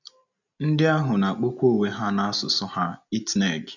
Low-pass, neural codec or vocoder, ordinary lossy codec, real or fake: 7.2 kHz; none; none; real